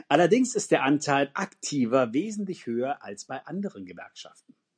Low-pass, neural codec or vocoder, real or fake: 9.9 kHz; none; real